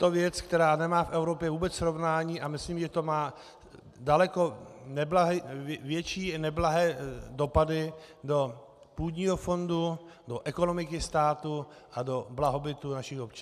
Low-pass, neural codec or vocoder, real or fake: 14.4 kHz; none; real